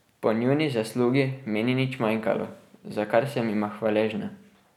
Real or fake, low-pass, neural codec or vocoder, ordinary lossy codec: real; 19.8 kHz; none; none